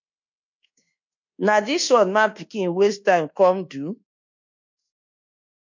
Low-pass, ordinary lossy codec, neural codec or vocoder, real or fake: 7.2 kHz; MP3, 48 kbps; codec, 24 kHz, 1.2 kbps, DualCodec; fake